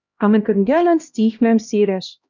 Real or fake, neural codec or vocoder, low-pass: fake; codec, 16 kHz, 1 kbps, X-Codec, HuBERT features, trained on LibriSpeech; 7.2 kHz